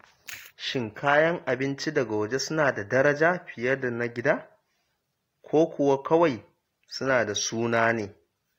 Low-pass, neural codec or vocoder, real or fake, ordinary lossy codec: 19.8 kHz; none; real; AAC, 48 kbps